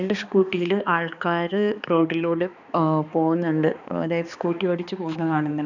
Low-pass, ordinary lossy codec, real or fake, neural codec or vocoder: 7.2 kHz; none; fake; codec, 16 kHz, 2 kbps, X-Codec, HuBERT features, trained on balanced general audio